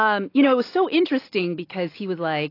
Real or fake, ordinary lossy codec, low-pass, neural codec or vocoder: real; AAC, 32 kbps; 5.4 kHz; none